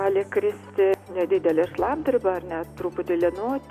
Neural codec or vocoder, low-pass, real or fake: none; 14.4 kHz; real